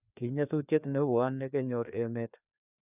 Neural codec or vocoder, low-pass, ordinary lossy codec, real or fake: codec, 16 kHz, 2 kbps, FreqCodec, larger model; 3.6 kHz; none; fake